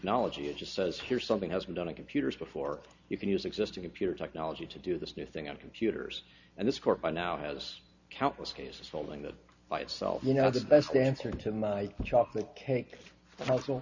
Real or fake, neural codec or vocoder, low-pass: real; none; 7.2 kHz